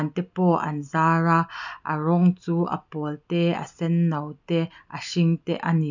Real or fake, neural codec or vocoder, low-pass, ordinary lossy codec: real; none; 7.2 kHz; none